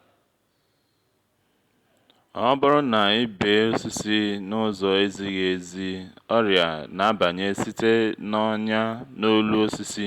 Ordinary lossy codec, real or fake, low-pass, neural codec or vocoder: Opus, 64 kbps; real; 19.8 kHz; none